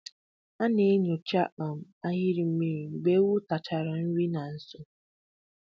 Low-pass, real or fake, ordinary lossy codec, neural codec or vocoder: none; real; none; none